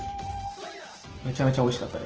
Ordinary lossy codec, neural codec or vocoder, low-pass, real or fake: Opus, 16 kbps; none; 7.2 kHz; real